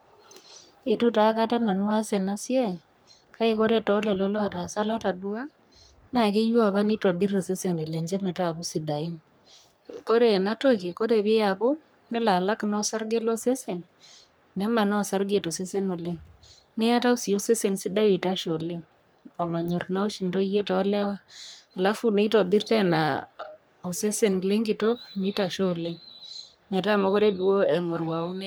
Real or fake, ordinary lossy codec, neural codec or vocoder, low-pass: fake; none; codec, 44.1 kHz, 3.4 kbps, Pupu-Codec; none